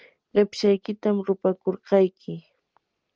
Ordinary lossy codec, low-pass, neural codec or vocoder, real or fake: Opus, 24 kbps; 7.2 kHz; none; real